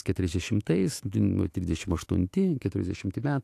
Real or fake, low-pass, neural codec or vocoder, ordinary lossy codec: fake; 14.4 kHz; autoencoder, 48 kHz, 128 numbers a frame, DAC-VAE, trained on Japanese speech; AAC, 64 kbps